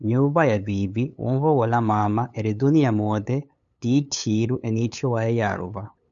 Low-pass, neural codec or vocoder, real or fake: 7.2 kHz; codec, 16 kHz, 8 kbps, FunCodec, trained on LibriTTS, 25 frames a second; fake